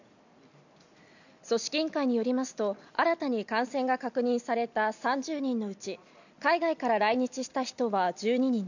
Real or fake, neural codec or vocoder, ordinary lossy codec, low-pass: real; none; none; 7.2 kHz